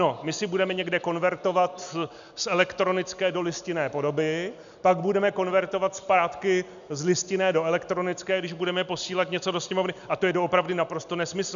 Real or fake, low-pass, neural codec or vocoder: real; 7.2 kHz; none